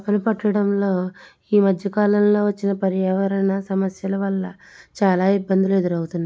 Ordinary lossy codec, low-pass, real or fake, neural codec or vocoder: none; none; real; none